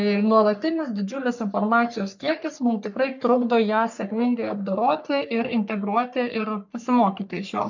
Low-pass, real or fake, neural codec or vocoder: 7.2 kHz; fake; codec, 44.1 kHz, 3.4 kbps, Pupu-Codec